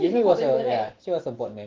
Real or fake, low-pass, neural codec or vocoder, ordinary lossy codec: fake; 7.2 kHz; autoencoder, 48 kHz, 128 numbers a frame, DAC-VAE, trained on Japanese speech; Opus, 16 kbps